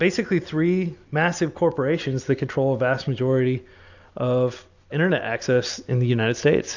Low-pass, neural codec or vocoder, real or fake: 7.2 kHz; none; real